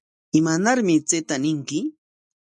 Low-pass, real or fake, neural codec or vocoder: 10.8 kHz; real; none